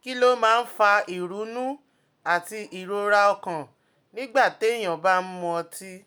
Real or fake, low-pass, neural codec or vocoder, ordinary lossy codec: real; none; none; none